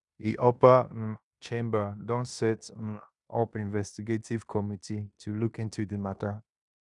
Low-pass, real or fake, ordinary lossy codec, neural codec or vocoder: 10.8 kHz; fake; none; codec, 16 kHz in and 24 kHz out, 0.9 kbps, LongCat-Audio-Codec, fine tuned four codebook decoder